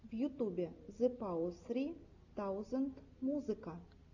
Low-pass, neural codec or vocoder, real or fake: 7.2 kHz; none; real